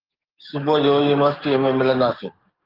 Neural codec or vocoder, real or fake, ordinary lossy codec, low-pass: codec, 44.1 kHz, 7.8 kbps, DAC; fake; Opus, 32 kbps; 5.4 kHz